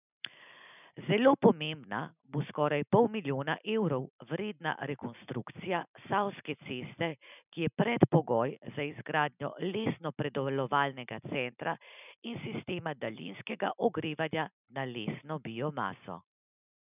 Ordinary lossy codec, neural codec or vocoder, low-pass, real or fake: none; none; 3.6 kHz; real